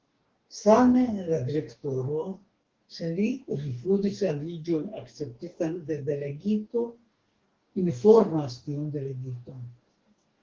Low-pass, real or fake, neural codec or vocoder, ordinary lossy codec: 7.2 kHz; fake; codec, 44.1 kHz, 2.6 kbps, DAC; Opus, 16 kbps